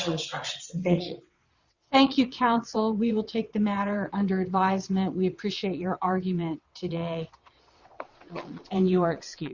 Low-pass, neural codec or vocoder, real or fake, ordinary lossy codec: 7.2 kHz; vocoder, 22.05 kHz, 80 mel bands, WaveNeXt; fake; Opus, 64 kbps